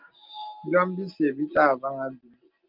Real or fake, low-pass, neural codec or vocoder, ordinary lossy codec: real; 5.4 kHz; none; Opus, 32 kbps